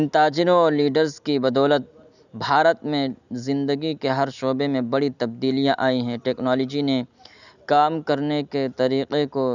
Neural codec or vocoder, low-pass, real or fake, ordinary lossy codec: none; 7.2 kHz; real; none